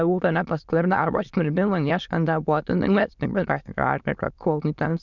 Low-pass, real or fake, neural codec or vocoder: 7.2 kHz; fake; autoencoder, 22.05 kHz, a latent of 192 numbers a frame, VITS, trained on many speakers